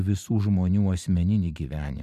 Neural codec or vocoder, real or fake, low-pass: vocoder, 44.1 kHz, 128 mel bands every 512 samples, BigVGAN v2; fake; 14.4 kHz